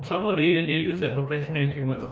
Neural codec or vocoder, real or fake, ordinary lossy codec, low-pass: codec, 16 kHz, 1 kbps, FreqCodec, larger model; fake; none; none